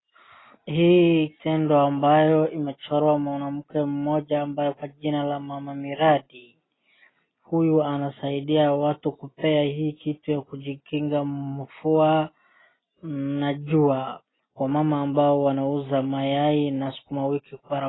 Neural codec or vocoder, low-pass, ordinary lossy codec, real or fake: none; 7.2 kHz; AAC, 16 kbps; real